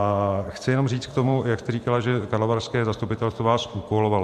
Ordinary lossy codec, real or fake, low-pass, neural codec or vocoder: MP3, 64 kbps; fake; 14.4 kHz; vocoder, 44.1 kHz, 128 mel bands every 512 samples, BigVGAN v2